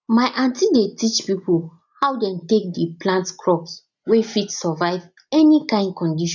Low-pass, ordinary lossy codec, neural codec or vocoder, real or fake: 7.2 kHz; none; none; real